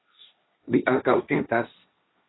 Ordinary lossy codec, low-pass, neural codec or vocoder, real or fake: AAC, 16 kbps; 7.2 kHz; codec, 16 kHz, 1.1 kbps, Voila-Tokenizer; fake